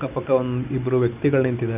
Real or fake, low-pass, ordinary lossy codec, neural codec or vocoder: real; 3.6 kHz; none; none